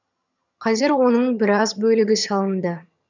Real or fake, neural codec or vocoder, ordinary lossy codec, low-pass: fake; vocoder, 22.05 kHz, 80 mel bands, HiFi-GAN; none; 7.2 kHz